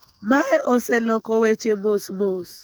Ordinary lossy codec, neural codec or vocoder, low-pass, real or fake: none; codec, 44.1 kHz, 2.6 kbps, SNAC; none; fake